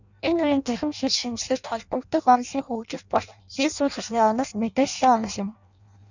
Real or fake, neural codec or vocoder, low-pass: fake; codec, 16 kHz in and 24 kHz out, 0.6 kbps, FireRedTTS-2 codec; 7.2 kHz